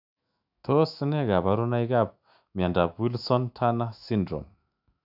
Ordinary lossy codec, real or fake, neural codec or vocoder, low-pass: AAC, 48 kbps; fake; autoencoder, 48 kHz, 128 numbers a frame, DAC-VAE, trained on Japanese speech; 5.4 kHz